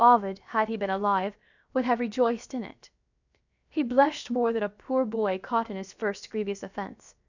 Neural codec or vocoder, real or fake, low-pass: codec, 16 kHz, about 1 kbps, DyCAST, with the encoder's durations; fake; 7.2 kHz